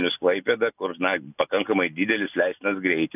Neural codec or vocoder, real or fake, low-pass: none; real; 3.6 kHz